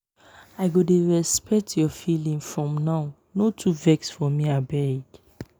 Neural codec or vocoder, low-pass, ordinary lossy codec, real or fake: none; none; none; real